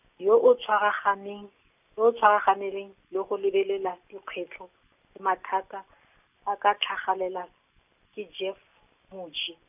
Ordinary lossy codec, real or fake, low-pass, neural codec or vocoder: none; real; 3.6 kHz; none